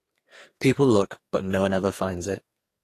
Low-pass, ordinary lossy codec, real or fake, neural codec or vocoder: 14.4 kHz; AAC, 48 kbps; fake; codec, 44.1 kHz, 2.6 kbps, SNAC